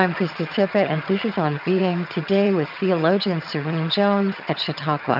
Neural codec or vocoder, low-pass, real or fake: vocoder, 22.05 kHz, 80 mel bands, HiFi-GAN; 5.4 kHz; fake